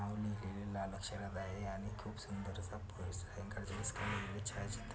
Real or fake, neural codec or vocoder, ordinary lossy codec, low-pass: real; none; none; none